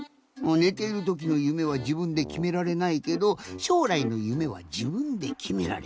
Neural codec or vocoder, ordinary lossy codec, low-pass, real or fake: none; none; none; real